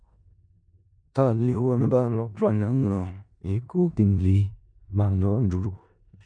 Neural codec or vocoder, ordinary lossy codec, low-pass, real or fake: codec, 16 kHz in and 24 kHz out, 0.4 kbps, LongCat-Audio-Codec, four codebook decoder; MP3, 64 kbps; 9.9 kHz; fake